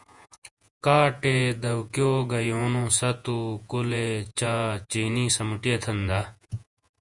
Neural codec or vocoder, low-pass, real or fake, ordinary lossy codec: vocoder, 48 kHz, 128 mel bands, Vocos; 10.8 kHz; fake; Opus, 64 kbps